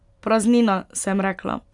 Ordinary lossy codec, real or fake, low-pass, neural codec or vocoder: none; real; 10.8 kHz; none